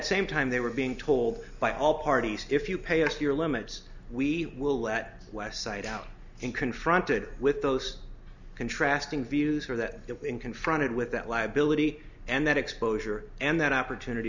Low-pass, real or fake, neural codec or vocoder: 7.2 kHz; real; none